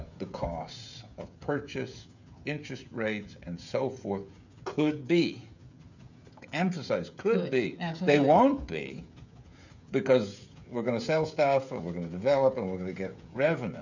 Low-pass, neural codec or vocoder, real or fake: 7.2 kHz; codec, 16 kHz, 16 kbps, FreqCodec, smaller model; fake